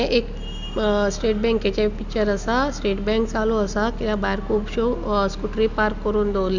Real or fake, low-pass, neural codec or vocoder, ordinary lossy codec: real; 7.2 kHz; none; none